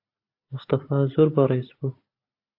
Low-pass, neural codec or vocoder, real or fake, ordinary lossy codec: 5.4 kHz; none; real; AAC, 32 kbps